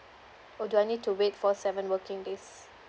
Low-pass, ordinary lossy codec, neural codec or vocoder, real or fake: none; none; none; real